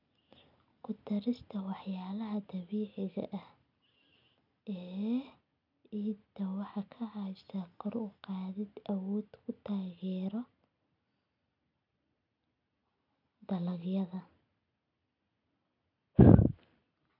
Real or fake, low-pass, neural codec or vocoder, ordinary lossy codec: real; 5.4 kHz; none; none